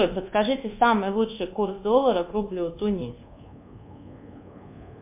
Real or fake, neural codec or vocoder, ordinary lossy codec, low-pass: fake; codec, 24 kHz, 1.2 kbps, DualCodec; MP3, 32 kbps; 3.6 kHz